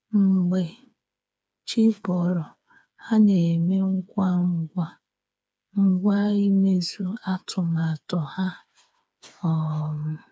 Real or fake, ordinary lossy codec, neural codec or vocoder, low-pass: fake; none; codec, 16 kHz, 4 kbps, FreqCodec, smaller model; none